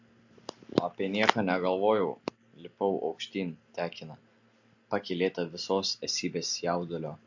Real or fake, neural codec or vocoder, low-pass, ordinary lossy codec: real; none; 7.2 kHz; MP3, 48 kbps